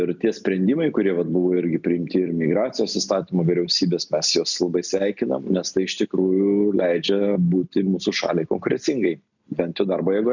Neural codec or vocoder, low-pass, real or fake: none; 7.2 kHz; real